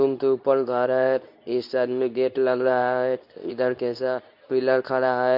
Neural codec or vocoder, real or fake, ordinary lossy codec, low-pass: codec, 24 kHz, 0.9 kbps, WavTokenizer, medium speech release version 2; fake; MP3, 48 kbps; 5.4 kHz